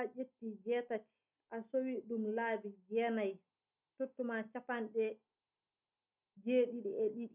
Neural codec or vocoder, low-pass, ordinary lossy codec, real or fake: none; 3.6 kHz; none; real